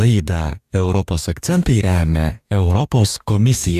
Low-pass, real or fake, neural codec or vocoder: 14.4 kHz; fake; codec, 44.1 kHz, 2.6 kbps, DAC